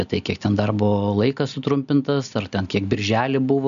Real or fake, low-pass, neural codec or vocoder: real; 7.2 kHz; none